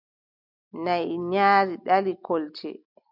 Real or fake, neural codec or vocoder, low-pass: real; none; 5.4 kHz